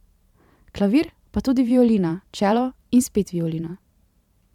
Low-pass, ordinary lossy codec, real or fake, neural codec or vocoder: 19.8 kHz; MP3, 96 kbps; fake; vocoder, 44.1 kHz, 128 mel bands every 512 samples, BigVGAN v2